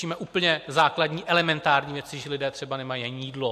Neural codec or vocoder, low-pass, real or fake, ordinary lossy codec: none; 14.4 kHz; real; MP3, 64 kbps